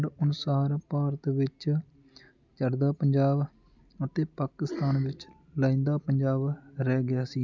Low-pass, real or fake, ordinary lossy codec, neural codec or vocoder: 7.2 kHz; real; none; none